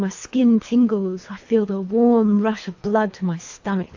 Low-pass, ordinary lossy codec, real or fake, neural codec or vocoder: 7.2 kHz; AAC, 48 kbps; fake; codec, 24 kHz, 3 kbps, HILCodec